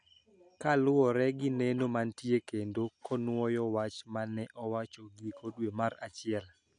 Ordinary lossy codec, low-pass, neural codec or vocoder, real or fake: none; 9.9 kHz; none; real